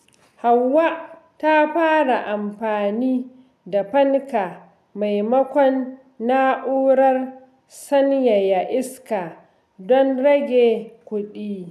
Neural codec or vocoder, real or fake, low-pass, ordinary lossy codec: none; real; 14.4 kHz; none